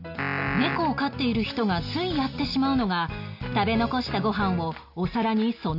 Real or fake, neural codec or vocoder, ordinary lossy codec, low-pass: real; none; none; 5.4 kHz